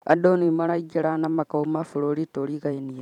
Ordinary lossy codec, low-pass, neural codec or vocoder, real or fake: none; 19.8 kHz; vocoder, 44.1 kHz, 128 mel bands every 512 samples, BigVGAN v2; fake